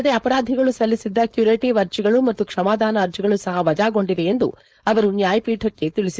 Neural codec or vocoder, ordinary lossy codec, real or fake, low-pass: codec, 16 kHz, 4.8 kbps, FACodec; none; fake; none